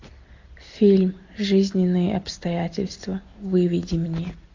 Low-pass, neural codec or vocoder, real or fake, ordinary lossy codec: 7.2 kHz; none; real; AAC, 48 kbps